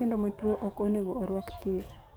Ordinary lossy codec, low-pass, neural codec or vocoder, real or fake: none; none; codec, 44.1 kHz, 7.8 kbps, DAC; fake